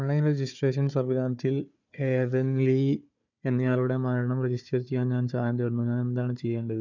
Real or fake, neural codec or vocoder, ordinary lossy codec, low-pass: fake; codec, 16 kHz, 4 kbps, FunCodec, trained on Chinese and English, 50 frames a second; none; 7.2 kHz